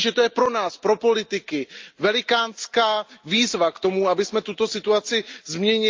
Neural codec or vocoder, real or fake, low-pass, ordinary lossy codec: none; real; 7.2 kHz; Opus, 24 kbps